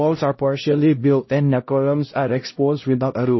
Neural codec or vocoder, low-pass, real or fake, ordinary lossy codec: codec, 16 kHz, 0.5 kbps, X-Codec, HuBERT features, trained on LibriSpeech; 7.2 kHz; fake; MP3, 24 kbps